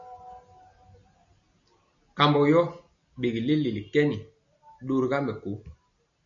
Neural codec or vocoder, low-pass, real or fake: none; 7.2 kHz; real